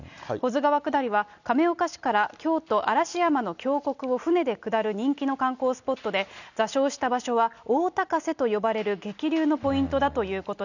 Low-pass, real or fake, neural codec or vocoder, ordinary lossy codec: 7.2 kHz; real; none; none